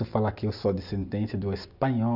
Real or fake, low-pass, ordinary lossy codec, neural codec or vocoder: real; 5.4 kHz; none; none